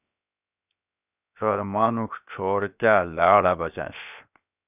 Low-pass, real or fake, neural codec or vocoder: 3.6 kHz; fake; codec, 16 kHz, 0.7 kbps, FocalCodec